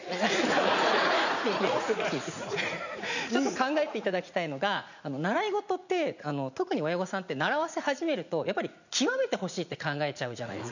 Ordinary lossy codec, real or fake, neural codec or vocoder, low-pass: none; fake; vocoder, 44.1 kHz, 80 mel bands, Vocos; 7.2 kHz